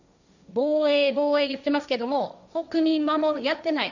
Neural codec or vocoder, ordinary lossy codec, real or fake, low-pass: codec, 16 kHz, 1.1 kbps, Voila-Tokenizer; none; fake; 7.2 kHz